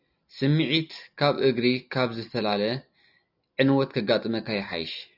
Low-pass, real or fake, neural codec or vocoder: 5.4 kHz; real; none